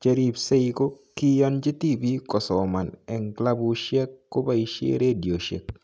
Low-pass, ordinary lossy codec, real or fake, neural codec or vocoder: none; none; real; none